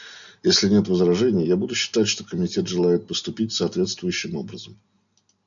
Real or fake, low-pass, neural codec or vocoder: real; 7.2 kHz; none